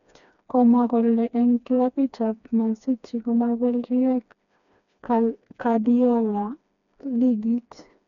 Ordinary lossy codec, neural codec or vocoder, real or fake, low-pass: none; codec, 16 kHz, 2 kbps, FreqCodec, smaller model; fake; 7.2 kHz